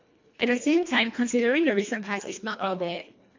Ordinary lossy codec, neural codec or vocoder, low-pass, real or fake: MP3, 48 kbps; codec, 24 kHz, 1.5 kbps, HILCodec; 7.2 kHz; fake